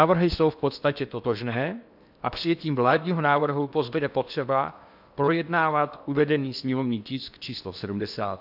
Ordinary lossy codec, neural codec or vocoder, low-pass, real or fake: AAC, 48 kbps; codec, 16 kHz in and 24 kHz out, 0.8 kbps, FocalCodec, streaming, 65536 codes; 5.4 kHz; fake